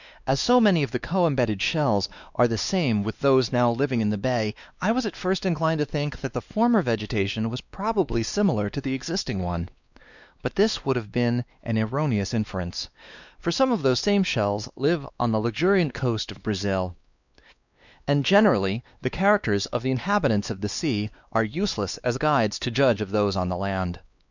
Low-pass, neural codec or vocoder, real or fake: 7.2 kHz; codec, 16 kHz, 2 kbps, X-Codec, WavLM features, trained on Multilingual LibriSpeech; fake